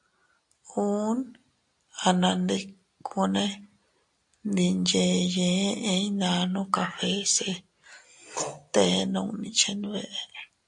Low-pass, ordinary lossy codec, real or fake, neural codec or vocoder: 10.8 kHz; AAC, 64 kbps; real; none